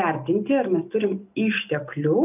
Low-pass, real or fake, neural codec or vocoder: 3.6 kHz; real; none